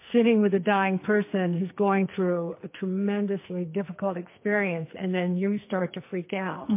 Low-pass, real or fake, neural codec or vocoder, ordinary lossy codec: 3.6 kHz; fake; codec, 44.1 kHz, 2.6 kbps, SNAC; MP3, 24 kbps